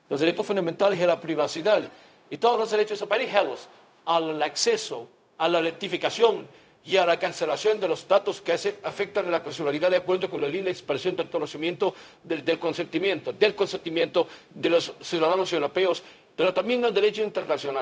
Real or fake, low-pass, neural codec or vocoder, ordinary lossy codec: fake; none; codec, 16 kHz, 0.4 kbps, LongCat-Audio-Codec; none